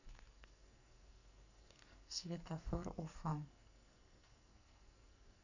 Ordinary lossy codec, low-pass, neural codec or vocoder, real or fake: none; 7.2 kHz; codec, 44.1 kHz, 2.6 kbps, SNAC; fake